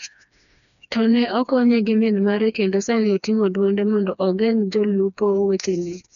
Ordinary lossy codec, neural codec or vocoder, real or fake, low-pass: none; codec, 16 kHz, 2 kbps, FreqCodec, smaller model; fake; 7.2 kHz